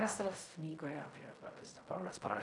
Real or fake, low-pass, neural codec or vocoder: fake; 10.8 kHz; codec, 16 kHz in and 24 kHz out, 0.4 kbps, LongCat-Audio-Codec, fine tuned four codebook decoder